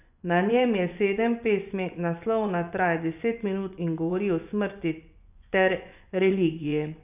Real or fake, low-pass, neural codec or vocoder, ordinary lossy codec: fake; 3.6 kHz; vocoder, 24 kHz, 100 mel bands, Vocos; none